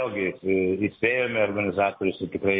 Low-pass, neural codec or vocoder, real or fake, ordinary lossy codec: 7.2 kHz; none; real; MP3, 24 kbps